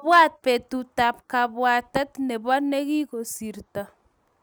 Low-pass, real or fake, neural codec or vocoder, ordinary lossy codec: none; real; none; none